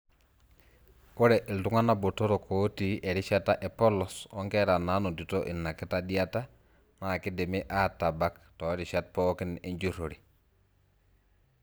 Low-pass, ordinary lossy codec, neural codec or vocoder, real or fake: none; none; none; real